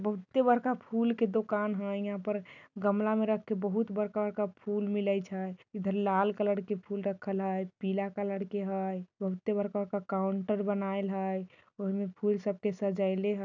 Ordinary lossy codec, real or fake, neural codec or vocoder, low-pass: none; real; none; 7.2 kHz